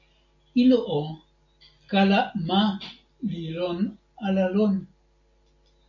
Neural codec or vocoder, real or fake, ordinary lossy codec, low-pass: none; real; MP3, 48 kbps; 7.2 kHz